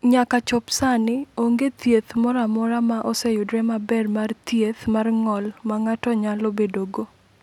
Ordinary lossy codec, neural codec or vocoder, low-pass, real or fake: none; none; 19.8 kHz; real